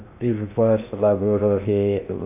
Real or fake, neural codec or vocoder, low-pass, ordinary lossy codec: fake; codec, 16 kHz in and 24 kHz out, 0.6 kbps, FocalCodec, streaming, 2048 codes; 3.6 kHz; none